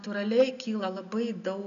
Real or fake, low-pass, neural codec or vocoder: real; 7.2 kHz; none